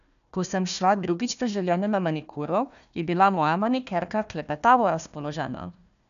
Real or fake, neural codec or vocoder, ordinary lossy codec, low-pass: fake; codec, 16 kHz, 1 kbps, FunCodec, trained on Chinese and English, 50 frames a second; none; 7.2 kHz